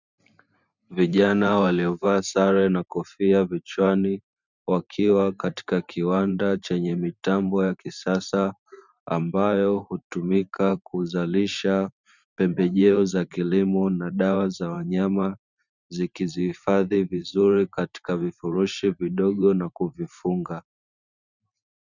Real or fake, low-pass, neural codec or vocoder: fake; 7.2 kHz; vocoder, 44.1 kHz, 128 mel bands every 512 samples, BigVGAN v2